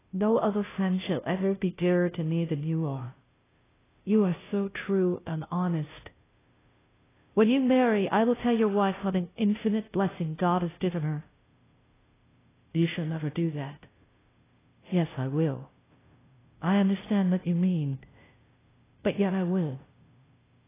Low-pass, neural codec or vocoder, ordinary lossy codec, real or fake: 3.6 kHz; codec, 16 kHz, 0.5 kbps, FunCodec, trained on Chinese and English, 25 frames a second; AAC, 16 kbps; fake